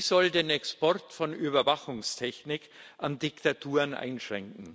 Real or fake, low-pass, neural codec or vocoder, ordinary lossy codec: real; none; none; none